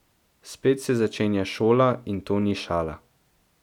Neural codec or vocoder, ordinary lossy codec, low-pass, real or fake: none; none; 19.8 kHz; real